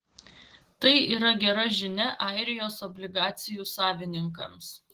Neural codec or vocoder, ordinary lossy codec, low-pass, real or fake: none; Opus, 16 kbps; 14.4 kHz; real